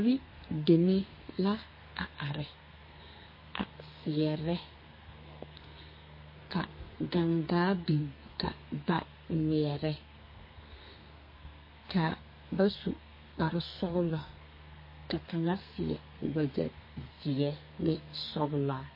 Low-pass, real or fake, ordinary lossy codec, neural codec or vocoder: 5.4 kHz; fake; MP3, 24 kbps; codec, 32 kHz, 1.9 kbps, SNAC